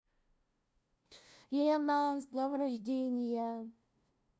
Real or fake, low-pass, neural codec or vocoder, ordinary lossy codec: fake; none; codec, 16 kHz, 0.5 kbps, FunCodec, trained on LibriTTS, 25 frames a second; none